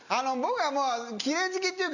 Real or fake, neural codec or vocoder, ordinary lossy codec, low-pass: real; none; none; 7.2 kHz